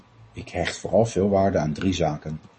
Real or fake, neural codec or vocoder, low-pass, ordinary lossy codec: real; none; 10.8 kHz; MP3, 32 kbps